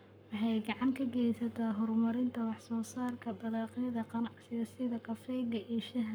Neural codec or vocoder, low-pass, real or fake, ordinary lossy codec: codec, 44.1 kHz, 7.8 kbps, Pupu-Codec; none; fake; none